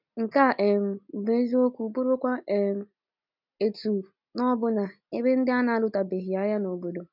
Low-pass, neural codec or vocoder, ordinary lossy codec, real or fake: 5.4 kHz; none; none; real